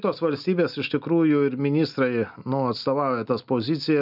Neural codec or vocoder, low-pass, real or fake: none; 5.4 kHz; real